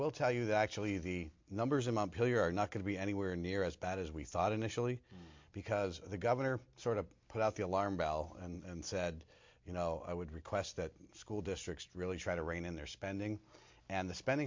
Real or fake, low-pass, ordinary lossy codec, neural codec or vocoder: real; 7.2 kHz; MP3, 48 kbps; none